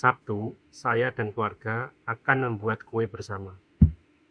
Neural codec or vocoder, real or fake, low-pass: autoencoder, 48 kHz, 32 numbers a frame, DAC-VAE, trained on Japanese speech; fake; 9.9 kHz